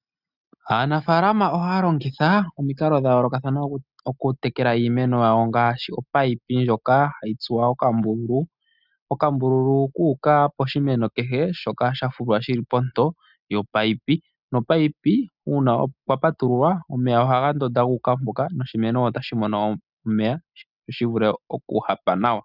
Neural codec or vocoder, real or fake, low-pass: none; real; 5.4 kHz